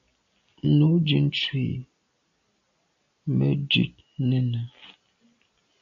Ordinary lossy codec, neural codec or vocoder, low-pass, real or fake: AAC, 64 kbps; none; 7.2 kHz; real